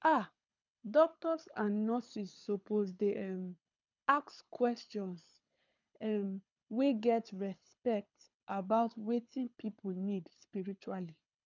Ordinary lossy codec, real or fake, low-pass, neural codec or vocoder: none; fake; 7.2 kHz; codec, 24 kHz, 6 kbps, HILCodec